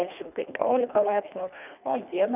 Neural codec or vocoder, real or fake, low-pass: codec, 24 kHz, 1.5 kbps, HILCodec; fake; 3.6 kHz